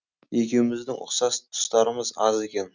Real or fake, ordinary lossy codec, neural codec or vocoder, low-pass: real; none; none; 7.2 kHz